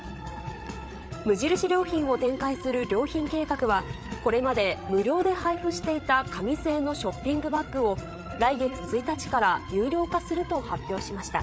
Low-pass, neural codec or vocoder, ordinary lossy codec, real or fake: none; codec, 16 kHz, 8 kbps, FreqCodec, larger model; none; fake